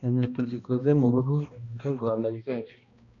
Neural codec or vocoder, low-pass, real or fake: codec, 16 kHz, 1 kbps, X-Codec, HuBERT features, trained on balanced general audio; 7.2 kHz; fake